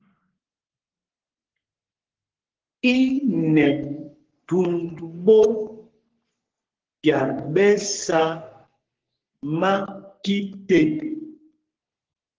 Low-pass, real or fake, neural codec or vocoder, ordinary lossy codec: 7.2 kHz; fake; codec, 44.1 kHz, 3.4 kbps, Pupu-Codec; Opus, 16 kbps